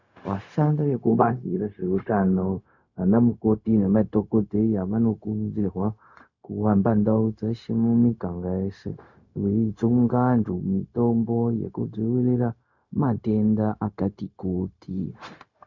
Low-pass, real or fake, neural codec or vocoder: 7.2 kHz; fake; codec, 16 kHz, 0.4 kbps, LongCat-Audio-Codec